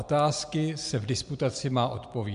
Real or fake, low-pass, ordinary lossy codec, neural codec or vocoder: real; 10.8 kHz; MP3, 64 kbps; none